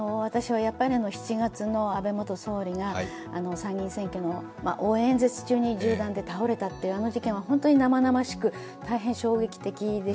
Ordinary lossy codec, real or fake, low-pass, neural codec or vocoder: none; real; none; none